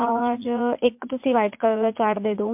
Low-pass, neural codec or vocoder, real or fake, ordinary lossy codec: 3.6 kHz; vocoder, 44.1 kHz, 80 mel bands, Vocos; fake; MP3, 32 kbps